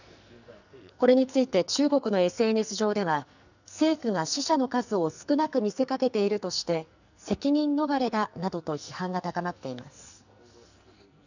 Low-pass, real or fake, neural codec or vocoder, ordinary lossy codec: 7.2 kHz; fake; codec, 44.1 kHz, 2.6 kbps, SNAC; none